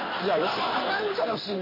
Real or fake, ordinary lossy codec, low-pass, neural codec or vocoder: fake; MP3, 24 kbps; 5.4 kHz; codec, 16 kHz in and 24 kHz out, 1 kbps, XY-Tokenizer